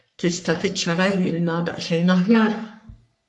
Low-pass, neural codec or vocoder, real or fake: 10.8 kHz; codec, 44.1 kHz, 3.4 kbps, Pupu-Codec; fake